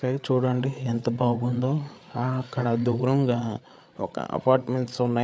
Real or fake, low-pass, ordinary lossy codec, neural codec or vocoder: fake; none; none; codec, 16 kHz, 8 kbps, FreqCodec, larger model